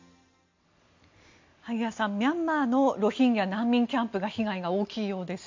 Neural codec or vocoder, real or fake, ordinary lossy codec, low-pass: none; real; MP3, 64 kbps; 7.2 kHz